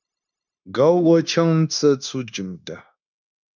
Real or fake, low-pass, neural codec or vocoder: fake; 7.2 kHz; codec, 16 kHz, 0.9 kbps, LongCat-Audio-Codec